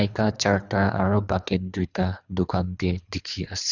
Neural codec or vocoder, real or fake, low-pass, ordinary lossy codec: codec, 24 kHz, 3 kbps, HILCodec; fake; 7.2 kHz; none